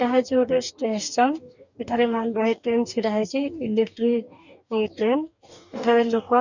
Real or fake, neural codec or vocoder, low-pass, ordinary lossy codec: fake; codec, 44.1 kHz, 2.6 kbps, DAC; 7.2 kHz; none